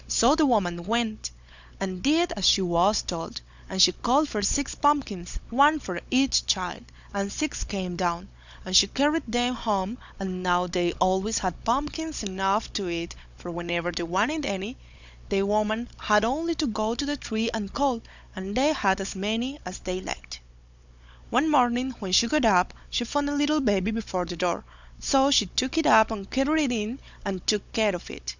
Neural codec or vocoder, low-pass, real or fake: codec, 16 kHz, 16 kbps, FunCodec, trained on Chinese and English, 50 frames a second; 7.2 kHz; fake